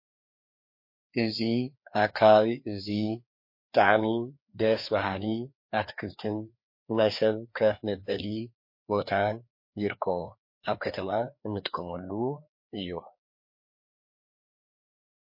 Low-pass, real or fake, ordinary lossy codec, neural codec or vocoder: 5.4 kHz; fake; MP3, 32 kbps; codec, 16 kHz, 2 kbps, FreqCodec, larger model